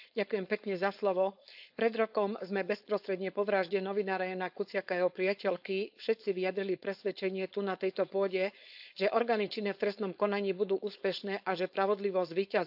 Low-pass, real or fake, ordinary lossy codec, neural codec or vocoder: 5.4 kHz; fake; AAC, 48 kbps; codec, 16 kHz, 4.8 kbps, FACodec